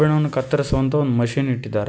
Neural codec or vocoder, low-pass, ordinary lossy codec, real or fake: none; none; none; real